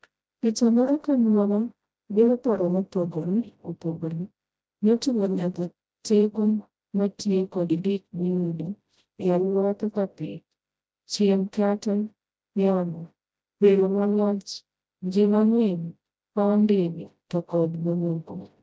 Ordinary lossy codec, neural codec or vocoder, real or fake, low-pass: none; codec, 16 kHz, 0.5 kbps, FreqCodec, smaller model; fake; none